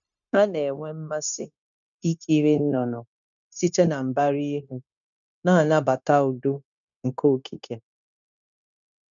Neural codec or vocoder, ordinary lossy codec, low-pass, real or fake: codec, 16 kHz, 0.9 kbps, LongCat-Audio-Codec; none; 7.2 kHz; fake